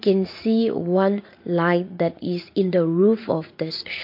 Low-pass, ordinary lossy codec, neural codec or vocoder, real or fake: 5.4 kHz; MP3, 32 kbps; vocoder, 44.1 kHz, 80 mel bands, Vocos; fake